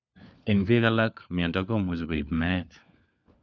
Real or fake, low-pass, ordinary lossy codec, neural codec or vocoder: fake; none; none; codec, 16 kHz, 4 kbps, FunCodec, trained on LibriTTS, 50 frames a second